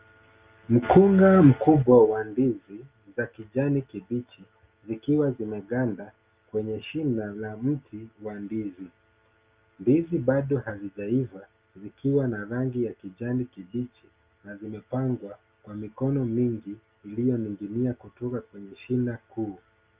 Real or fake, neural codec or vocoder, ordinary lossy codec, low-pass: real; none; Opus, 24 kbps; 3.6 kHz